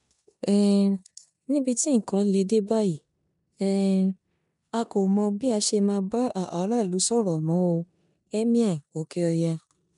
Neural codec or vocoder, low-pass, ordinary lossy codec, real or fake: codec, 16 kHz in and 24 kHz out, 0.9 kbps, LongCat-Audio-Codec, four codebook decoder; 10.8 kHz; none; fake